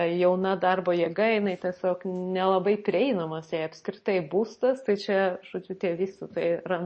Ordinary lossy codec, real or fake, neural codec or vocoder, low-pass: MP3, 32 kbps; real; none; 10.8 kHz